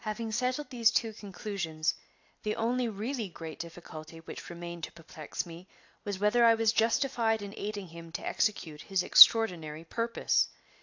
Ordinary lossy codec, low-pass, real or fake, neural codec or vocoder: AAC, 48 kbps; 7.2 kHz; real; none